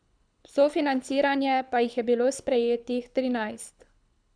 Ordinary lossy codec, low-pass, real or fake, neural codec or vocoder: none; 9.9 kHz; fake; codec, 24 kHz, 6 kbps, HILCodec